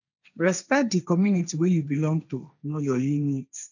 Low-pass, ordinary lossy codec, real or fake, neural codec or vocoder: 7.2 kHz; none; fake; codec, 16 kHz, 1.1 kbps, Voila-Tokenizer